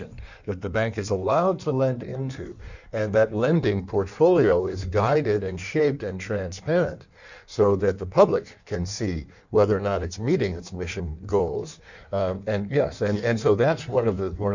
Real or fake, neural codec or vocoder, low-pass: fake; codec, 16 kHz in and 24 kHz out, 1.1 kbps, FireRedTTS-2 codec; 7.2 kHz